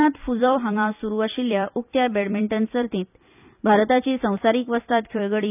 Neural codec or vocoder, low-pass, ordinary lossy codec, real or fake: vocoder, 44.1 kHz, 128 mel bands every 256 samples, BigVGAN v2; 3.6 kHz; none; fake